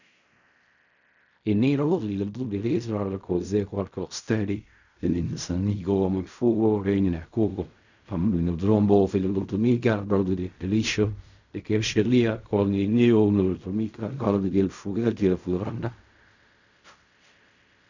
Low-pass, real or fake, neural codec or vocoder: 7.2 kHz; fake; codec, 16 kHz in and 24 kHz out, 0.4 kbps, LongCat-Audio-Codec, fine tuned four codebook decoder